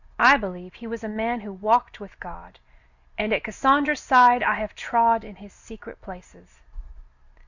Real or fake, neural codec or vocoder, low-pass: real; none; 7.2 kHz